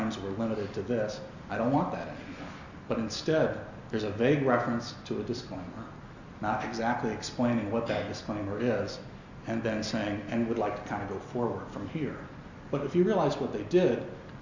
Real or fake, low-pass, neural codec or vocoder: real; 7.2 kHz; none